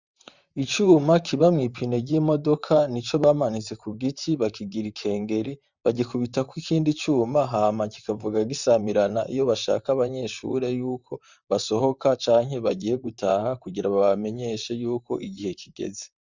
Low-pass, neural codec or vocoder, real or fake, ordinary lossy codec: 7.2 kHz; vocoder, 44.1 kHz, 128 mel bands, Pupu-Vocoder; fake; Opus, 64 kbps